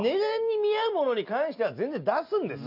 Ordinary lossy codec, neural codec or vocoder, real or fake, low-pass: none; none; real; 5.4 kHz